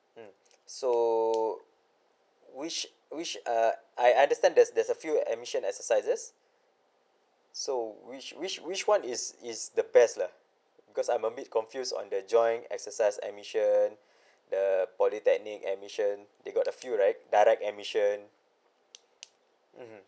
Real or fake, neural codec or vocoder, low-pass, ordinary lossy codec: real; none; none; none